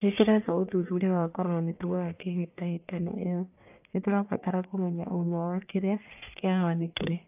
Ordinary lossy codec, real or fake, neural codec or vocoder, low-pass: AAC, 32 kbps; fake; codec, 44.1 kHz, 1.7 kbps, Pupu-Codec; 3.6 kHz